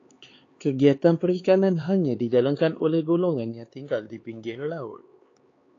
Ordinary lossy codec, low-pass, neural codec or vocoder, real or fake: AAC, 32 kbps; 7.2 kHz; codec, 16 kHz, 4 kbps, X-Codec, HuBERT features, trained on LibriSpeech; fake